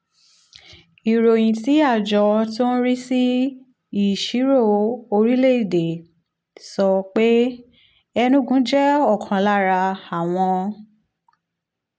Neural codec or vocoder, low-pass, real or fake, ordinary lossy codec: none; none; real; none